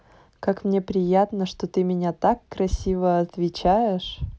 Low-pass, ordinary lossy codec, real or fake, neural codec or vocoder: none; none; real; none